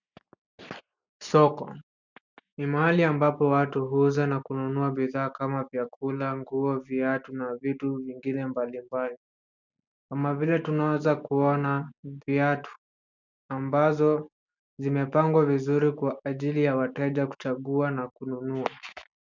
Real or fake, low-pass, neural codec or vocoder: real; 7.2 kHz; none